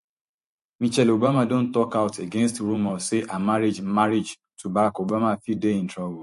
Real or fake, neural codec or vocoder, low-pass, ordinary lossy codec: fake; vocoder, 44.1 kHz, 128 mel bands every 512 samples, BigVGAN v2; 14.4 kHz; MP3, 48 kbps